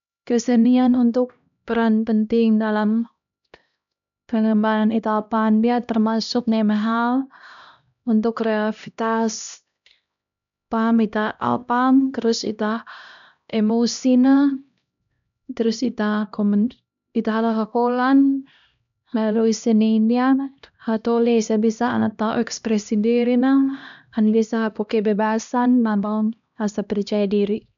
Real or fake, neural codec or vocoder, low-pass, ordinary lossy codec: fake; codec, 16 kHz, 1 kbps, X-Codec, HuBERT features, trained on LibriSpeech; 7.2 kHz; none